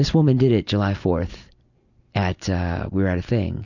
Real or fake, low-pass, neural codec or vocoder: real; 7.2 kHz; none